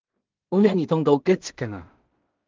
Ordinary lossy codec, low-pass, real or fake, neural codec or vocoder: Opus, 32 kbps; 7.2 kHz; fake; codec, 16 kHz in and 24 kHz out, 0.4 kbps, LongCat-Audio-Codec, two codebook decoder